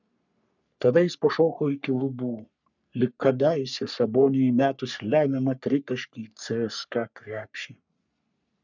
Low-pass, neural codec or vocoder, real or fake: 7.2 kHz; codec, 44.1 kHz, 3.4 kbps, Pupu-Codec; fake